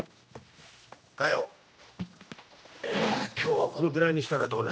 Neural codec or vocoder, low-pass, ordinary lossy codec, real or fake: codec, 16 kHz, 1 kbps, X-Codec, HuBERT features, trained on general audio; none; none; fake